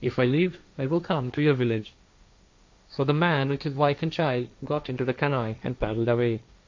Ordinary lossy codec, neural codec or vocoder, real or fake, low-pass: MP3, 48 kbps; codec, 16 kHz, 1.1 kbps, Voila-Tokenizer; fake; 7.2 kHz